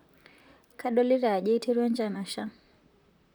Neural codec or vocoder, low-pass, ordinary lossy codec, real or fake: vocoder, 44.1 kHz, 128 mel bands, Pupu-Vocoder; none; none; fake